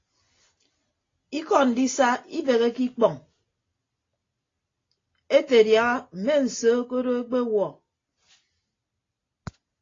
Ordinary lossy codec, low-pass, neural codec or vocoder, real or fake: AAC, 32 kbps; 7.2 kHz; none; real